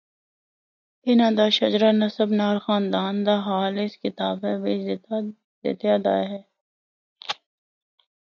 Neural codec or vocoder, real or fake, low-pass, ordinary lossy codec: none; real; 7.2 kHz; MP3, 64 kbps